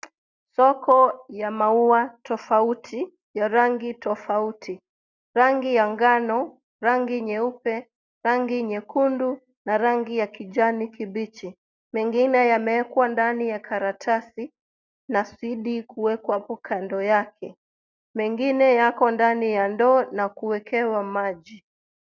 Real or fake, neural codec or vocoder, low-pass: real; none; 7.2 kHz